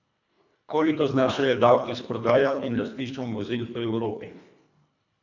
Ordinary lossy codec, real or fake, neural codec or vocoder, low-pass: none; fake; codec, 24 kHz, 1.5 kbps, HILCodec; 7.2 kHz